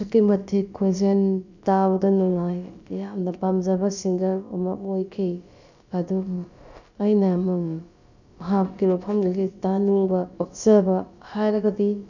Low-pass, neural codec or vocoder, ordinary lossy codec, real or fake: 7.2 kHz; codec, 16 kHz, about 1 kbps, DyCAST, with the encoder's durations; none; fake